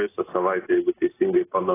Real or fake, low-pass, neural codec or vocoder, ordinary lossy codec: real; 3.6 kHz; none; AAC, 16 kbps